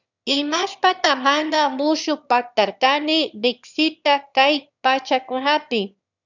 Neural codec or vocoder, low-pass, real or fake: autoencoder, 22.05 kHz, a latent of 192 numbers a frame, VITS, trained on one speaker; 7.2 kHz; fake